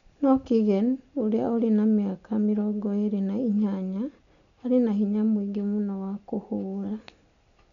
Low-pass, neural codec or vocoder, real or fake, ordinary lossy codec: 7.2 kHz; none; real; MP3, 96 kbps